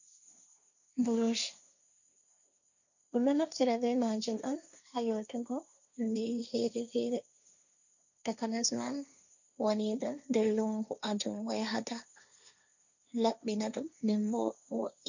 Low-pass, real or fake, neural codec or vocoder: 7.2 kHz; fake; codec, 16 kHz, 1.1 kbps, Voila-Tokenizer